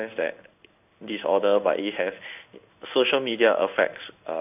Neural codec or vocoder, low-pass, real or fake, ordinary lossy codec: codec, 16 kHz in and 24 kHz out, 1 kbps, XY-Tokenizer; 3.6 kHz; fake; none